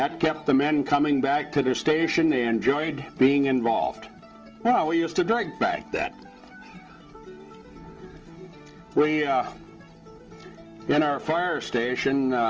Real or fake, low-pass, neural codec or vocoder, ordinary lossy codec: real; 7.2 kHz; none; Opus, 24 kbps